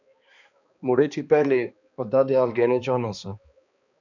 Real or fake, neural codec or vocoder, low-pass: fake; codec, 16 kHz, 2 kbps, X-Codec, HuBERT features, trained on balanced general audio; 7.2 kHz